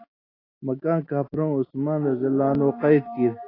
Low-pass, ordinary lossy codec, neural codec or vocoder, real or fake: 5.4 kHz; AAC, 24 kbps; none; real